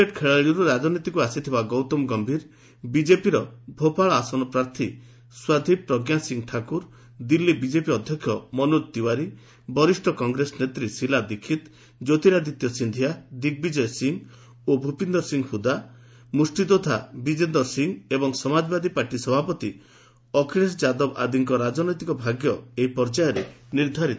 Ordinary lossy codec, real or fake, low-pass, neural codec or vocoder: none; real; none; none